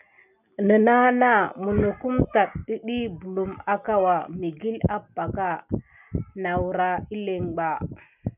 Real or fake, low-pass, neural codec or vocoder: real; 3.6 kHz; none